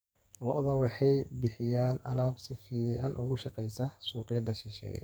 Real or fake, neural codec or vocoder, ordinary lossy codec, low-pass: fake; codec, 44.1 kHz, 2.6 kbps, SNAC; none; none